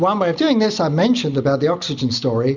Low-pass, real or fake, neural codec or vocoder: 7.2 kHz; real; none